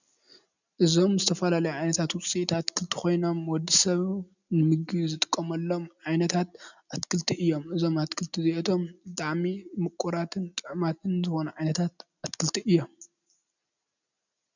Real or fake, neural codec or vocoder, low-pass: real; none; 7.2 kHz